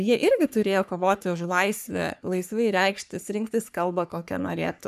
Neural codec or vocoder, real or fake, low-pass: codec, 44.1 kHz, 3.4 kbps, Pupu-Codec; fake; 14.4 kHz